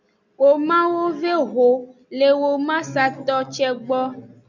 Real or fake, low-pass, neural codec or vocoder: real; 7.2 kHz; none